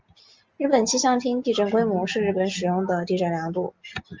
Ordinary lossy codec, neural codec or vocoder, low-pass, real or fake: Opus, 24 kbps; none; 7.2 kHz; real